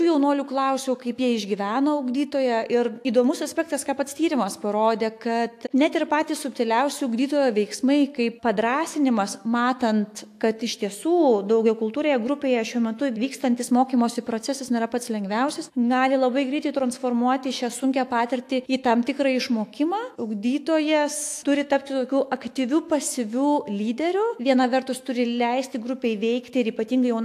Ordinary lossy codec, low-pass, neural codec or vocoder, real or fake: AAC, 64 kbps; 14.4 kHz; autoencoder, 48 kHz, 128 numbers a frame, DAC-VAE, trained on Japanese speech; fake